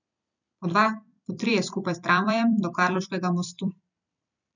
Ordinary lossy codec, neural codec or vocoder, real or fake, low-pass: none; vocoder, 44.1 kHz, 128 mel bands every 256 samples, BigVGAN v2; fake; 7.2 kHz